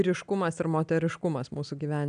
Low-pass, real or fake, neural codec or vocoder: 9.9 kHz; real; none